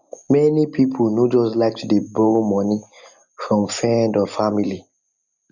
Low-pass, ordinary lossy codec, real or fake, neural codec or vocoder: 7.2 kHz; none; real; none